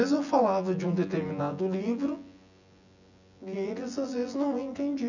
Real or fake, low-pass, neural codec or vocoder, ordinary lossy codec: fake; 7.2 kHz; vocoder, 24 kHz, 100 mel bands, Vocos; none